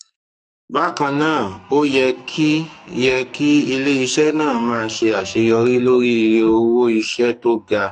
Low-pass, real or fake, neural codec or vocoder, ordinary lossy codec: 14.4 kHz; fake; codec, 44.1 kHz, 2.6 kbps, SNAC; AAC, 64 kbps